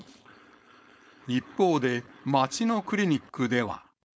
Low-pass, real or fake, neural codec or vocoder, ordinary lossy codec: none; fake; codec, 16 kHz, 4.8 kbps, FACodec; none